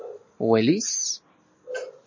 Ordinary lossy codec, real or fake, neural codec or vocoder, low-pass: MP3, 32 kbps; real; none; 7.2 kHz